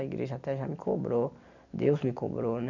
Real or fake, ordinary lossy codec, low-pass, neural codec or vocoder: real; none; 7.2 kHz; none